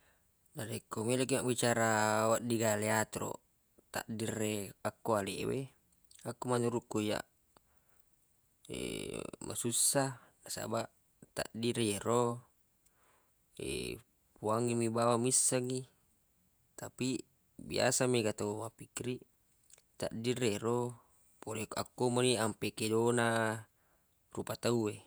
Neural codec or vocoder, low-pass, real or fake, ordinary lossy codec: vocoder, 48 kHz, 128 mel bands, Vocos; none; fake; none